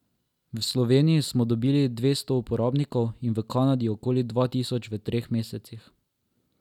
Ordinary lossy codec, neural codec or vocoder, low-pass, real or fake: none; none; 19.8 kHz; real